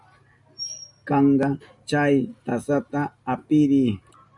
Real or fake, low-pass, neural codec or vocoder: real; 10.8 kHz; none